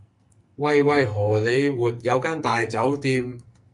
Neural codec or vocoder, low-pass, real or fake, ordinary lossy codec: codec, 44.1 kHz, 2.6 kbps, SNAC; 10.8 kHz; fake; MP3, 96 kbps